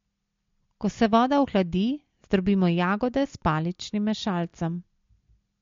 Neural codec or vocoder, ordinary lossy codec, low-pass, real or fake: none; MP3, 48 kbps; 7.2 kHz; real